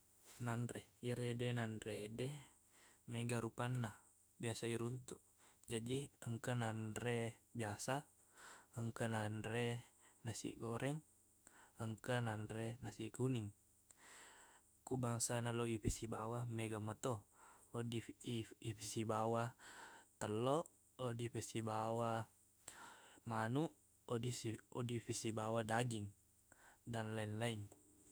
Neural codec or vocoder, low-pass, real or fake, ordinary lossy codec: autoencoder, 48 kHz, 32 numbers a frame, DAC-VAE, trained on Japanese speech; none; fake; none